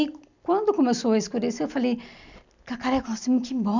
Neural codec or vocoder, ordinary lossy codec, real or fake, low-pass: none; none; real; 7.2 kHz